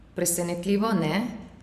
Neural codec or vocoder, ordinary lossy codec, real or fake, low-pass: vocoder, 44.1 kHz, 128 mel bands every 512 samples, BigVGAN v2; none; fake; 14.4 kHz